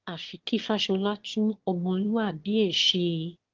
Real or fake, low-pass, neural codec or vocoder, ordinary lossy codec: fake; 7.2 kHz; autoencoder, 22.05 kHz, a latent of 192 numbers a frame, VITS, trained on one speaker; Opus, 16 kbps